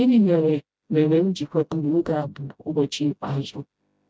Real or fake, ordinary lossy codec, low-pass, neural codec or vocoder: fake; none; none; codec, 16 kHz, 0.5 kbps, FreqCodec, smaller model